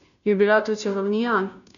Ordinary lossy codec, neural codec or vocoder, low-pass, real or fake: none; codec, 16 kHz, 0.5 kbps, FunCodec, trained on Chinese and English, 25 frames a second; 7.2 kHz; fake